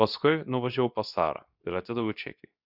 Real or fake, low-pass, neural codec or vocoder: fake; 5.4 kHz; codec, 24 kHz, 0.9 kbps, WavTokenizer, medium speech release version 2